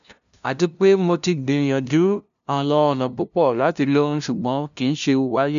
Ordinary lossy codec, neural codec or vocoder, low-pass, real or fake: none; codec, 16 kHz, 0.5 kbps, FunCodec, trained on LibriTTS, 25 frames a second; 7.2 kHz; fake